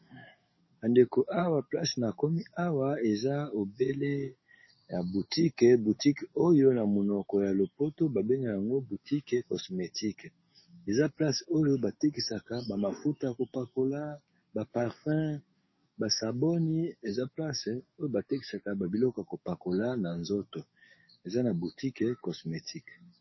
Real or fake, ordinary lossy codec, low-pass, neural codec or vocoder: fake; MP3, 24 kbps; 7.2 kHz; codec, 44.1 kHz, 7.8 kbps, DAC